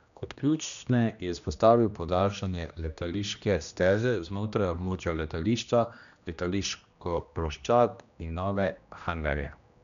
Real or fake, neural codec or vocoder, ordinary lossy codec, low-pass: fake; codec, 16 kHz, 1 kbps, X-Codec, HuBERT features, trained on general audio; none; 7.2 kHz